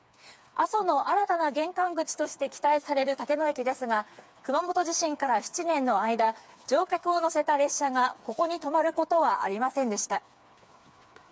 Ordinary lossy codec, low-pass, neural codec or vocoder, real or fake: none; none; codec, 16 kHz, 4 kbps, FreqCodec, smaller model; fake